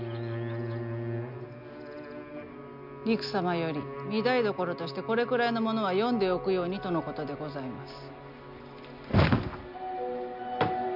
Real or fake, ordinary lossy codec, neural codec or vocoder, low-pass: real; none; none; 5.4 kHz